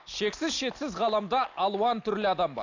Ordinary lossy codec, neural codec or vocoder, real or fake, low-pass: AAC, 48 kbps; none; real; 7.2 kHz